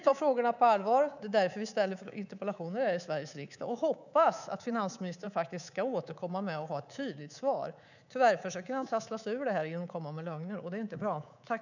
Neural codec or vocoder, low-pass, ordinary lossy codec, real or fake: codec, 24 kHz, 3.1 kbps, DualCodec; 7.2 kHz; none; fake